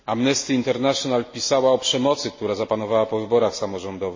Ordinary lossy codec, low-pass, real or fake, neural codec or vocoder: MP3, 32 kbps; 7.2 kHz; real; none